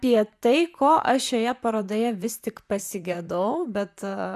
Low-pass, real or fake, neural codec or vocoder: 14.4 kHz; fake; vocoder, 44.1 kHz, 128 mel bands, Pupu-Vocoder